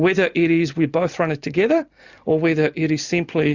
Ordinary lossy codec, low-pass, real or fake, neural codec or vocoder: Opus, 64 kbps; 7.2 kHz; real; none